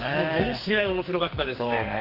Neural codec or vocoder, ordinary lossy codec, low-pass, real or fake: codec, 44.1 kHz, 2.6 kbps, SNAC; Opus, 24 kbps; 5.4 kHz; fake